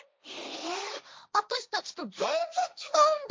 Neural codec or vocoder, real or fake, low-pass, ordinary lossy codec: codec, 16 kHz, 1.1 kbps, Voila-Tokenizer; fake; none; none